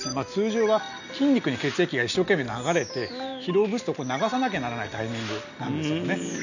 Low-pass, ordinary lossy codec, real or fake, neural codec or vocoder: 7.2 kHz; none; real; none